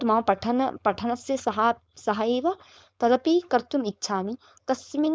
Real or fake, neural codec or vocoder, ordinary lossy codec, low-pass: fake; codec, 16 kHz, 4.8 kbps, FACodec; none; none